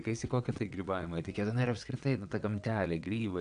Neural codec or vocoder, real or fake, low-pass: vocoder, 22.05 kHz, 80 mel bands, WaveNeXt; fake; 9.9 kHz